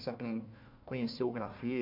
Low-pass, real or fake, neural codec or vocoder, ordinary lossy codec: 5.4 kHz; fake; codec, 16 kHz, 1 kbps, FunCodec, trained on Chinese and English, 50 frames a second; MP3, 48 kbps